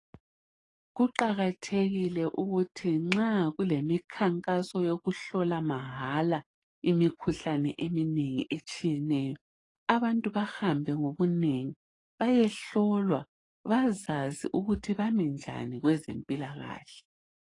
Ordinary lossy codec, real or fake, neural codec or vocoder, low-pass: AAC, 32 kbps; fake; codec, 44.1 kHz, 7.8 kbps, DAC; 10.8 kHz